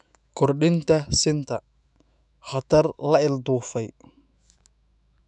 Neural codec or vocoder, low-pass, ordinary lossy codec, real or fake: autoencoder, 48 kHz, 128 numbers a frame, DAC-VAE, trained on Japanese speech; 10.8 kHz; none; fake